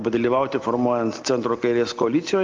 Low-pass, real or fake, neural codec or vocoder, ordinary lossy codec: 7.2 kHz; real; none; Opus, 32 kbps